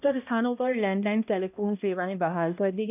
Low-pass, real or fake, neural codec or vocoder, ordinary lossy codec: 3.6 kHz; fake; codec, 16 kHz, 1 kbps, X-Codec, HuBERT features, trained on balanced general audio; none